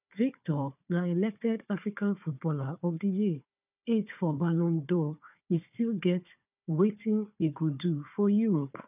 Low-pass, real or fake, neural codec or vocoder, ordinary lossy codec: 3.6 kHz; fake; codec, 16 kHz, 4 kbps, FunCodec, trained on Chinese and English, 50 frames a second; none